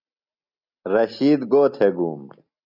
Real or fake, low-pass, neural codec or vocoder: real; 5.4 kHz; none